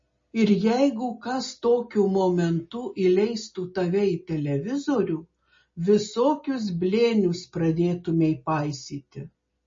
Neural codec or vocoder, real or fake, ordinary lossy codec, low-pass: none; real; MP3, 32 kbps; 7.2 kHz